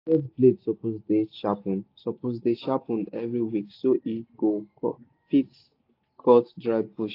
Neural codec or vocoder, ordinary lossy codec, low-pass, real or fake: none; AAC, 32 kbps; 5.4 kHz; real